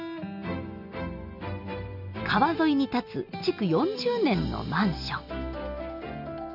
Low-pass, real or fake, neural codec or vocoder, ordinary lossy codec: 5.4 kHz; real; none; AAC, 48 kbps